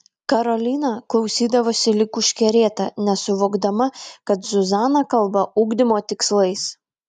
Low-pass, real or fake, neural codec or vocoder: 10.8 kHz; real; none